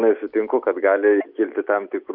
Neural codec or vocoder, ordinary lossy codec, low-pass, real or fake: none; Opus, 64 kbps; 5.4 kHz; real